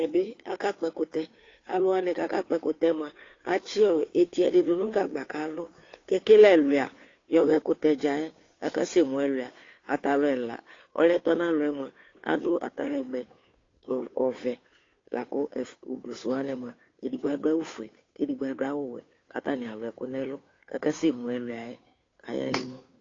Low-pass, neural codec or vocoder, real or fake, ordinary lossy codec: 7.2 kHz; codec, 16 kHz, 2 kbps, FunCodec, trained on Chinese and English, 25 frames a second; fake; AAC, 32 kbps